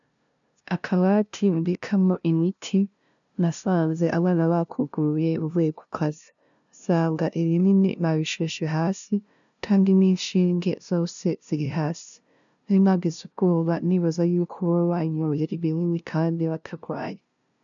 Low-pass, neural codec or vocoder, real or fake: 7.2 kHz; codec, 16 kHz, 0.5 kbps, FunCodec, trained on LibriTTS, 25 frames a second; fake